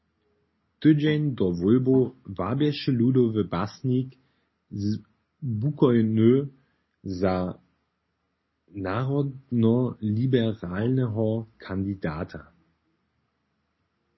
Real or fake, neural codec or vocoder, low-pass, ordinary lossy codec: real; none; 7.2 kHz; MP3, 24 kbps